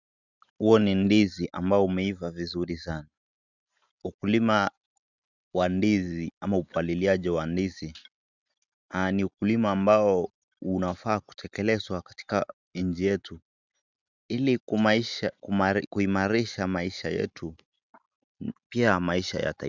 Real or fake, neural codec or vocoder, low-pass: real; none; 7.2 kHz